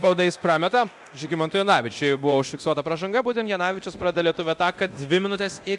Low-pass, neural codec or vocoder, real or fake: 10.8 kHz; codec, 24 kHz, 0.9 kbps, DualCodec; fake